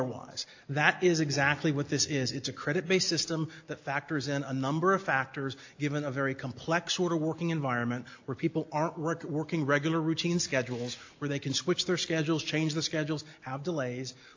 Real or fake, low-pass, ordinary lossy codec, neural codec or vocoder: real; 7.2 kHz; AAC, 48 kbps; none